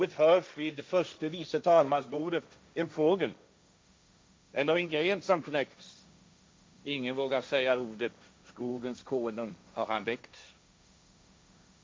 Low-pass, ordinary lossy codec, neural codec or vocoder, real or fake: none; none; codec, 16 kHz, 1.1 kbps, Voila-Tokenizer; fake